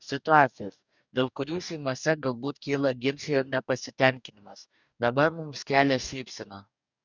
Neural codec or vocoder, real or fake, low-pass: codec, 44.1 kHz, 2.6 kbps, DAC; fake; 7.2 kHz